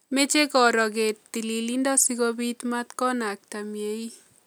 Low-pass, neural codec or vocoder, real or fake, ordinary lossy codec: none; none; real; none